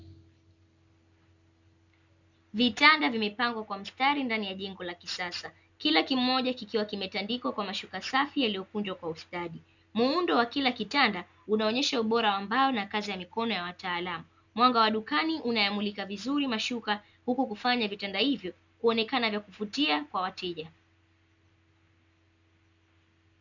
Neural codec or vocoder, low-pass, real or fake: none; 7.2 kHz; real